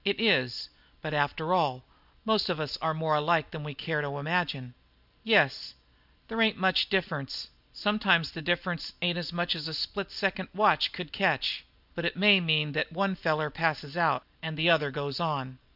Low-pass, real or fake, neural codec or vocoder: 5.4 kHz; real; none